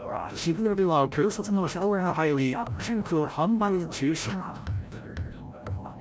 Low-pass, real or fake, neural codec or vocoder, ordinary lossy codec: none; fake; codec, 16 kHz, 0.5 kbps, FreqCodec, larger model; none